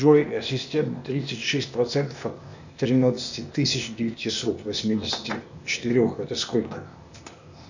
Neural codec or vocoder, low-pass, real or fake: codec, 16 kHz, 0.8 kbps, ZipCodec; 7.2 kHz; fake